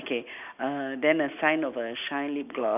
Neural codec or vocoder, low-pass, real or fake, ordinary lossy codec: none; 3.6 kHz; real; none